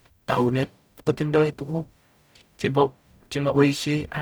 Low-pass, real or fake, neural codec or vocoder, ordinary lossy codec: none; fake; codec, 44.1 kHz, 0.9 kbps, DAC; none